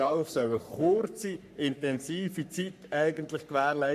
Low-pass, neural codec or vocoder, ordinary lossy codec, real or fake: 14.4 kHz; codec, 44.1 kHz, 3.4 kbps, Pupu-Codec; none; fake